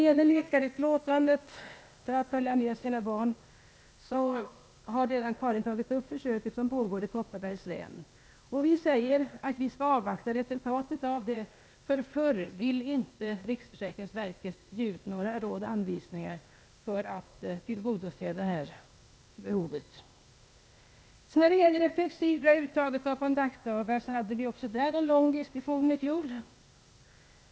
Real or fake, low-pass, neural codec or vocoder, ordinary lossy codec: fake; none; codec, 16 kHz, 0.8 kbps, ZipCodec; none